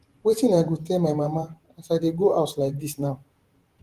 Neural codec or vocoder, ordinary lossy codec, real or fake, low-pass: vocoder, 48 kHz, 128 mel bands, Vocos; Opus, 24 kbps; fake; 14.4 kHz